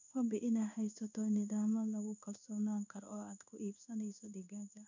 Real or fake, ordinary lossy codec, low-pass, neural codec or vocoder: fake; none; 7.2 kHz; codec, 16 kHz in and 24 kHz out, 1 kbps, XY-Tokenizer